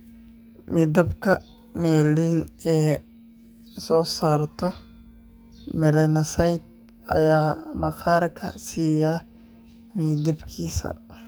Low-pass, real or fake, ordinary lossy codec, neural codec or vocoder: none; fake; none; codec, 44.1 kHz, 2.6 kbps, SNAC